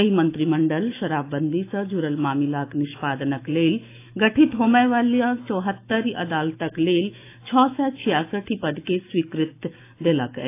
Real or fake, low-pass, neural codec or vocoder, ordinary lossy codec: fake; 3.6 kHz; autoencoder, 48 kHz, 128 numbers a frame, DAC-VAE, trained on Japanese speech; AAC, 24 kbps